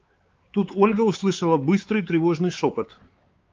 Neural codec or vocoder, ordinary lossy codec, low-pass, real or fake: codec, 16 kHz, 4 kbps, X-Codec, WavLM features, trained on Multilingual LibriSpeech; Opus, 24 kbps; 7.2 kHz; fake